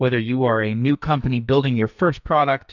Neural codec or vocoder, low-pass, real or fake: codec, 44.1 kHz, 2.6 kbps, SNAC; 7.2 kHz; fake